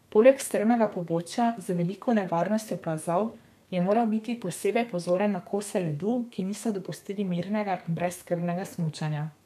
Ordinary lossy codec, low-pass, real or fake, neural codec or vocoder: none; 14.4 kHz; fake; codec, 32 kHz, 1.9 kbps, SNAC